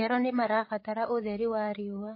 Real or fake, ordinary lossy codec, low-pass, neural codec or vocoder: fake; MP3, 24 kbps; 5.4 kHz; codec, 16 kHz, 16 kbps, FreqCodec, larger model